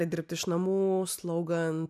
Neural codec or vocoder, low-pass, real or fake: none; 14.4 kHz; real